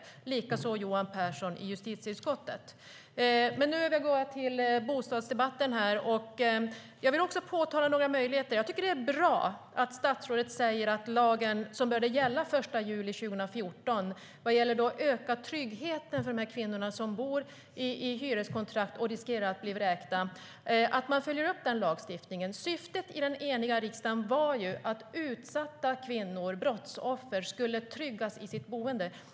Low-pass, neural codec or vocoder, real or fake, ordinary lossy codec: none; none; real; none